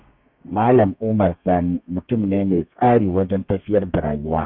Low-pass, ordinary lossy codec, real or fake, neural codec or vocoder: 5.4 kHz; none; fake; codec, 32 kHz, 1.9 kbps, SNAC